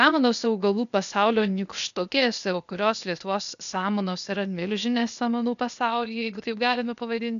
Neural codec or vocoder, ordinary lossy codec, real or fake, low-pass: codec, 16 kHz, 0.8 kbps, ZipCodec; MP3, 96 kbps; fake; 7.2 kHz